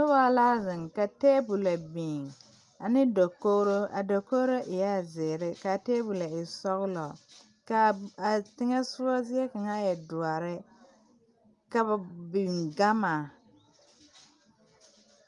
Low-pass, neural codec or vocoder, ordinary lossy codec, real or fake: 10.8 kHz; none; Opus, 32 kbps; real